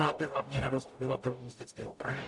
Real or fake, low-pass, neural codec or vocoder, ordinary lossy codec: fake; 10.8 kHz; codec, 44.1 kHz, 0.9 kbps, DAC; AAC, 64 kbps